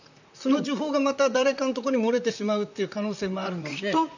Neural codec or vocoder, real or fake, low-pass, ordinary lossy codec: vocoder, 44.1 kHz, 128 mel bands, Pupu-Vocoder; fake; 7.2 kHz; none